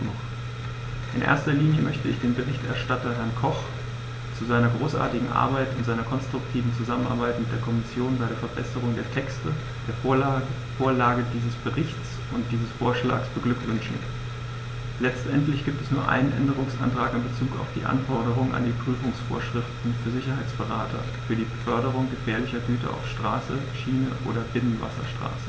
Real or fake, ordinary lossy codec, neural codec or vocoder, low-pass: real; none; none; none